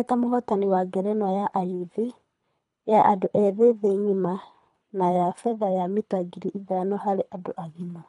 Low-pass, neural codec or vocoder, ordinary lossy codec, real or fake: 10.8 kHz; codec, 24 kHz, 3 kbps, HILCodec; MP3, 96 kbps; fake